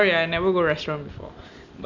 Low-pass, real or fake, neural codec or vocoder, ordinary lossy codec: 7.2 kHz; real; none; none